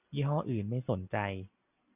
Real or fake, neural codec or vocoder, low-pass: real; none; 3.6 kHz